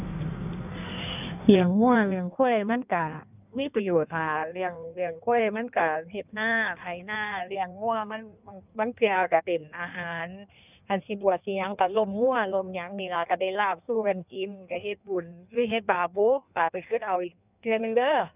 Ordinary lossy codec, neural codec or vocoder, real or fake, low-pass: none; codec, 16 kHz in and 24 kHz out, 1.1 kbps, FireRedTTS-2 codec; fake; 3.6 kHz